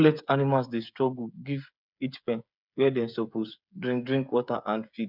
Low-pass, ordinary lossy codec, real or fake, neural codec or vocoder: 5.4 kHz; none; fake; codec, 16 kHz, 8 kbps, FreqCodec, smaller model